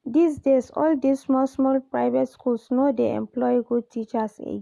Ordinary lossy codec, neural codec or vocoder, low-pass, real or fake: none; none; none; real